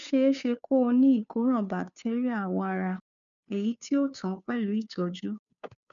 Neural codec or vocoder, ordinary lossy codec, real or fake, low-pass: codec, 16 kHz, 2 kbps, FunCodec, trained on Chinese and English, 25 frames a second; none; fake; 7.2 kHz